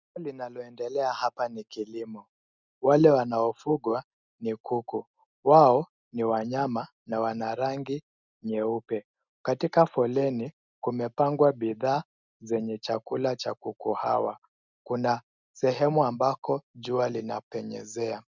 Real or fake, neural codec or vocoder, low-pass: real; none; 7.2 kHz